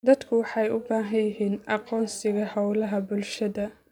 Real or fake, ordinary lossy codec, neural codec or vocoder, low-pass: real; none; none; 19.8 kHz